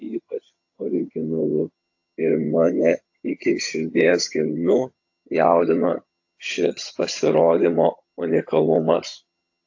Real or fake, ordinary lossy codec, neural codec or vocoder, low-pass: fake; AAC, 48 kbps; vocoder, 22.05 kHz, 80 mel bands, HiFi-GAN; 7.2 kHz